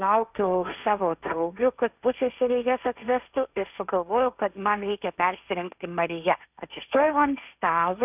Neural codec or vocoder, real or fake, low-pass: codec, 16 kHz, 1.1 kbps, Voila-Tokenizer; fake; 3.6 kHz